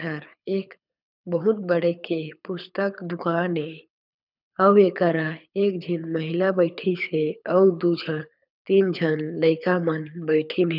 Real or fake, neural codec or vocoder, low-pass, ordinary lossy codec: fake; codec, 24 kHz, 6 kbps, HILCodec; 5.4 kHz; none